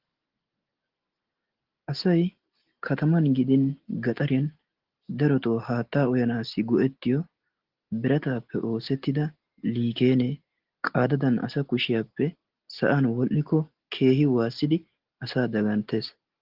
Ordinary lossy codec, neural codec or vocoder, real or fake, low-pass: Opus, 16 kbps; none; real; 5.4 kHz